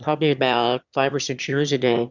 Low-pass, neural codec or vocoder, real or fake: 7.2 kHz; autoencoder, 22.05 kHz, a latent of 192 numbers a frame, VITS, trained on one speaker; fake